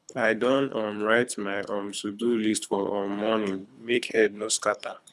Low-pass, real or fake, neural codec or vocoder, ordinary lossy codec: none; fake; codec, 24 kHz, 3 kbps, HILCodec; none